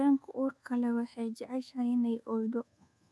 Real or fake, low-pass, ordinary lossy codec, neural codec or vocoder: fake; none; none; codec, 24 kHz, 1.2 kbps, DualCodec